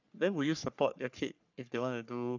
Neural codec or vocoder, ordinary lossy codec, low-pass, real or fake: codec, 44.1 kHz, 3.4 kbps, Pupu-Codec; none; 7.2 kHz; fake